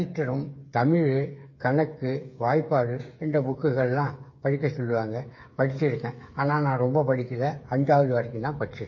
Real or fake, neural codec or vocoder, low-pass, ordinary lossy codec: fake; codec, 16 kHz, 8 kbps, FreqCodec, smaller model; 7.2 kHz; MP3, 32 kbps